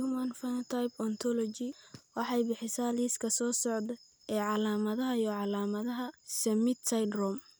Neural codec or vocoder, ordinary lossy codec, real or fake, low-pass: none; none; real; none